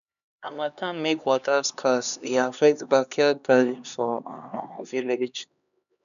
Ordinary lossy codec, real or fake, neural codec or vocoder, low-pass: none; fake; codec, 16 kHz, 2 kbps, X-Codec, HuBERT features, trained on LibriSpeech; 7.2 kHz